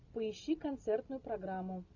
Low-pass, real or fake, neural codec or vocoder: 7.2 kHz; real; none